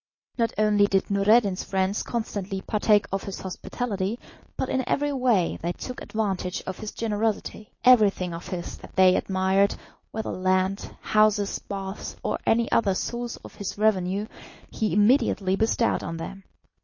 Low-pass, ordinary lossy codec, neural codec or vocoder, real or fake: 7.2 kHz; MP3, 32 kbps; none; real